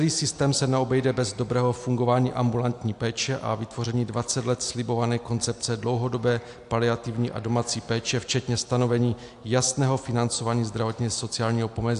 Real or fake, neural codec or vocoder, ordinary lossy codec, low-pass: real; none; AAC, 64 kbps; 10.8 kHz